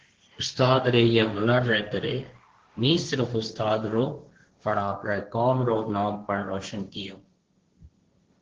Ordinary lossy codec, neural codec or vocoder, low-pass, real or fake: Opus, 16 kbps; codec, 16 kHz, 1.1 kbps, Voila-Tokenizer; 7.2 kHz; fake